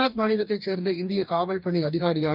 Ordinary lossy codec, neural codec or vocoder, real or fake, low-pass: none; codec, 44.1 kHz, 2.6 kbps, DAC; fake; 5.4 kHz